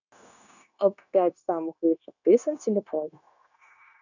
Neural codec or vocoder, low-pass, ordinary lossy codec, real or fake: codec, 16 kHz, 0.9 kbps, LongCat-Audio-Codec; 7.2 kHz; none; fake